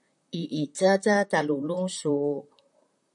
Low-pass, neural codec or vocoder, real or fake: 10.8 kHz; vocoder, 44.1 kHz, 128 mel bands, Pupu-Vocoder; fake